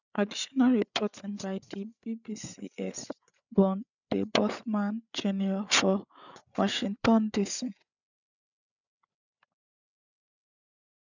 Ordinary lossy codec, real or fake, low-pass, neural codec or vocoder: none; fake; 7.2 kHz; codec, 16 kHz, 8 kbps, FreqCodec, larger model